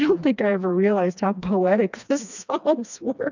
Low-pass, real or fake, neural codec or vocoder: 7.2 kHz; fake; codec, 16 kHz, 2 kbps, FreqCodec, smaller model